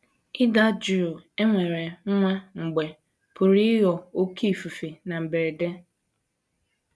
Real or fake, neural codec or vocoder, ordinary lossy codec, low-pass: real; none; none; none